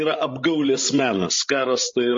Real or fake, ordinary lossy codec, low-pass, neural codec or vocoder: fake; MP3, 32 kbps; 7.2 kHz; codec, 16 kHz, 16 kbps, FreqCodec, larger model